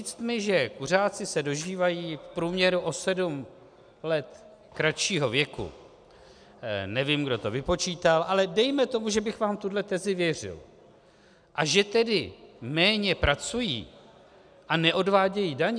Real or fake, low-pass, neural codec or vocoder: real; 9.9 kHz; none